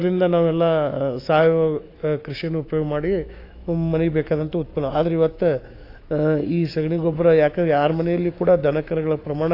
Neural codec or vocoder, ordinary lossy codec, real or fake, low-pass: autoencoder, 48 kHz, 128 numbers a frame, DAC-VAE, trained on Japanese speech; AAC, 32 kbps; fake; 5.4 kHz